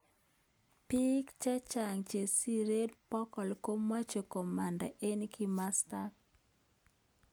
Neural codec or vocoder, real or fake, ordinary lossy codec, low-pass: none; real; none; none